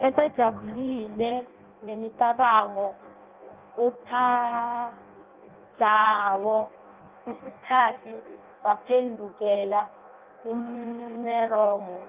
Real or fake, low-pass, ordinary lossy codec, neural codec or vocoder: fake; 3.6 kHz; Opus, 32 kbps; codec, 16 kHz in and 24 kHz out, 0.6 kbps, FireRedTTS-2 codec